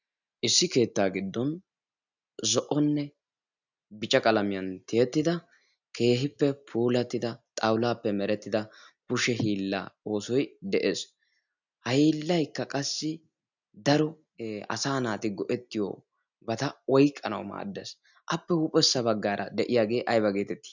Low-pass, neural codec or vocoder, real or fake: 7.2 kHz; none; real